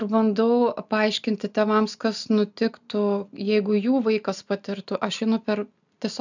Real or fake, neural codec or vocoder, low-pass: real; none; 7.2 kHz